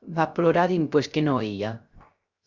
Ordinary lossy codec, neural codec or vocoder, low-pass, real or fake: Opus, 64 kbps; codec, 16 kHz, 0.3 kbps, FocalCodec; 7.2 kHz; fake